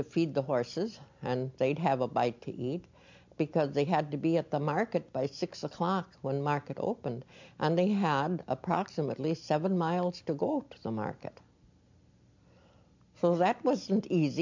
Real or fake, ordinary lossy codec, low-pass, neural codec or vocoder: real; MP3, 64 kbps; 7.2 kHz; none